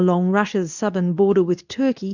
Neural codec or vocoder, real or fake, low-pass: codec, 24 kHz, 0.9 kbps, WavTokenizer, medium speech release version 2; fake; 7.2 kHz